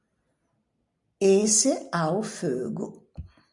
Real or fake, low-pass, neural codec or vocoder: real; 10.8 kHz; none